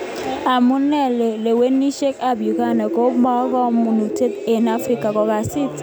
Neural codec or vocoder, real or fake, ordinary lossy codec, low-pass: none; real; none; none